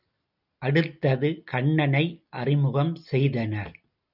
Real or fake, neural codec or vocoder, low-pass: real; none; 5.4 kHz